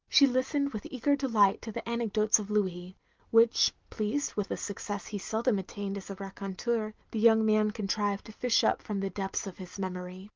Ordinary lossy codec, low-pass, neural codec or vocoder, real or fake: Opus, 24 kbps; 7.2 kHz; none; real